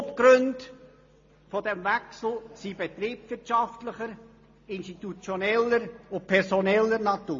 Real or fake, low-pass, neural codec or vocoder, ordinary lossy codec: real; 7.2 kHz; none; MP3, 32 kbps